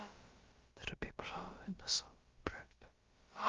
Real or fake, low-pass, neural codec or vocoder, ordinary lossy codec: fake; 7.2 kHz; codec, 16 kHz, about 1 kbps, DyCAST, with the encoder's durations; Opus, 32 kbps